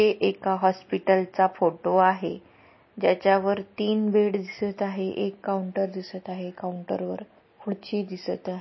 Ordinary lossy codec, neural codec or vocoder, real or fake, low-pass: MP3, 24 kbps; none; real; 7.2 kHz